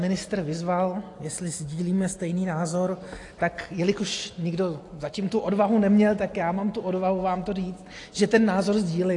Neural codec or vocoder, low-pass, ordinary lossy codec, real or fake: none; 10.8 kHz; AAC, 48 kbps; real